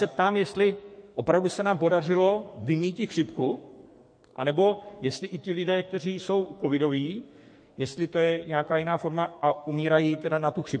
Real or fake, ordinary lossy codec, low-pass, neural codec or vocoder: fake; MP3, 48 kbps; 9.9 kHz; codec, 44.1 kHz, 2.6 kbps, SNAC